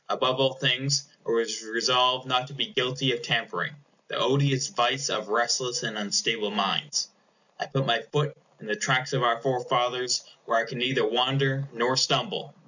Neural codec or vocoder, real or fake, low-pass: none; real; 7.2 kHz